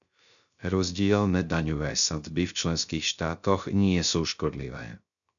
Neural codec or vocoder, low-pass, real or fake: codec, 16 kHz, 0.3 kbps, FocalCodec; 7.2 kHz; fake